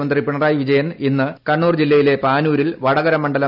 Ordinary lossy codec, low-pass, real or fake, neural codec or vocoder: none; 5.4 kHz; real; none